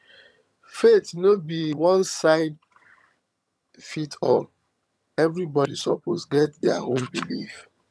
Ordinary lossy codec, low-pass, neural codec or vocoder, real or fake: none; none; vocoder, 22.05 kHz, 80 mel bands, HiFi-GAN; fake